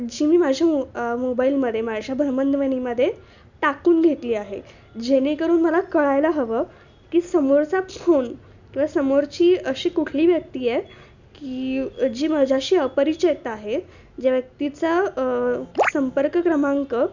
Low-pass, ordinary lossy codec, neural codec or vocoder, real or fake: 7.2 kHz; none; none; real